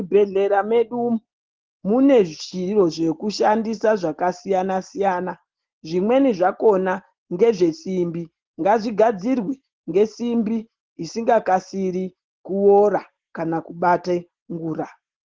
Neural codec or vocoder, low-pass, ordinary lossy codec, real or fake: none; 7.2 kHz; Opus, 16 kbps; real